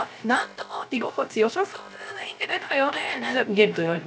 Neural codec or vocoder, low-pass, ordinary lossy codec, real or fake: codec, 16 kHz, 0.3 kbps, FocalCodec; none; none; fake